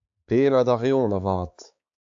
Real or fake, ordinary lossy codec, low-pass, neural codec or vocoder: fake; AAC, 64 kbps; 7.2 kHz; codec, 16 kHz, 4 kbps, X-Codec, HuBERT features, trained on balanced general audio